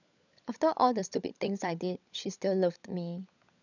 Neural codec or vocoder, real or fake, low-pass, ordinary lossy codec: codec, 16 kHz, 16 kbps, FunCodec, trained on LibriTTS, 50 frames a second; fake; 7.2 kHz; none